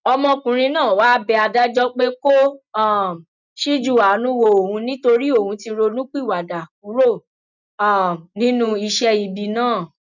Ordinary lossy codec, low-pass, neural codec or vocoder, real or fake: none; 7.2 kHz; none; real